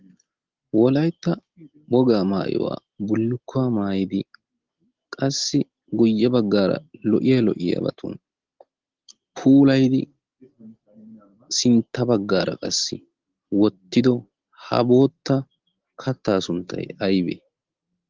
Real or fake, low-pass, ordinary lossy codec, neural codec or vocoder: real; 7.2 kHz; Opus, 16 kbps; none